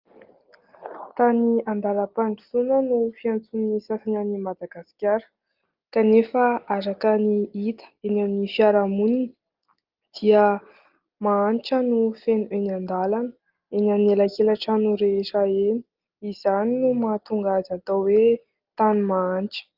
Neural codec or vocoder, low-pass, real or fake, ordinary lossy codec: none; 5.4 kHz; real; Opus, 24 kbps